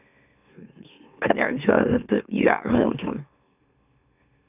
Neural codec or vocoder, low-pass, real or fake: autoencoder, 44.1 kHz, a latent of 192 numbers a frame, MeloTTS; 3.6 kHz; fake